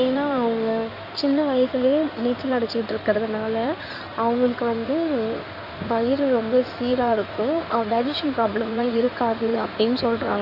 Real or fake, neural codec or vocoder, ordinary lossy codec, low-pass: fake; codec, 16 kHz in and 24 kHz out, 2.2 kbps, FireRedTTS-2 codec; none; 5.4 kHz